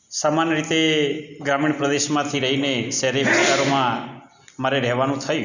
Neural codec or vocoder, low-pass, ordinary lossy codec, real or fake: none; 7.2 kHz; none; real